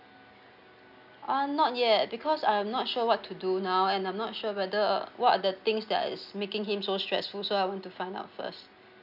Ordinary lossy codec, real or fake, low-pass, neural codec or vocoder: none; real; 5.4 kHz; none